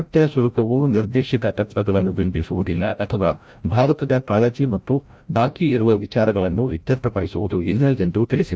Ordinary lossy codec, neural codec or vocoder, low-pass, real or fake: none; codec, 16 kHz, 0.5 kbps, FreqCodec, larger model; none; fake